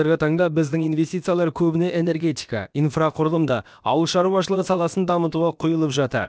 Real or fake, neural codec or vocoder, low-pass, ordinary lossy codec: fake; codec, 16 kHz, about 1 kbps, DyCAST, with the encoder's durations; none; none